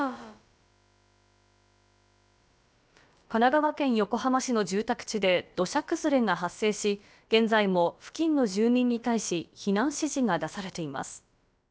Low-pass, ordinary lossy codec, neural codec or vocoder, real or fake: none; none; codec, 16 kHz, about 1 kbps, DyCAST, with the encoder's durations; fake